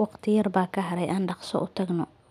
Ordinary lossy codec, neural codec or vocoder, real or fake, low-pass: none; none; real; 14.4 kHz